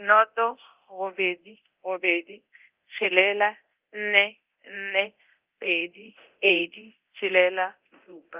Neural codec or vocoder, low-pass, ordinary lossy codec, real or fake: codec, 24 kHz, 0.9 kbps, DualCodec; 3.6 kHz; Opus, 32 kbps; fake